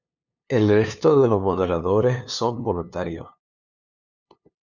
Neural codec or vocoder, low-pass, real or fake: codec, 16 kHz, 2 kbps, FunCodec, trained on LibriTTS, 25 frames a second; 7.2 kHz; fake